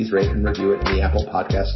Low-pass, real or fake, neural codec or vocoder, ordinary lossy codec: 7.2 kHz; real; none; MP3, 24 kbps